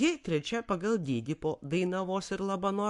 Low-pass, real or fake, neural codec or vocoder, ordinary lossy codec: 10.8 kHz; fake; codec, 44.1 kHz, 7.8 kbps, Pupu-Codec; MP3, 64 kbps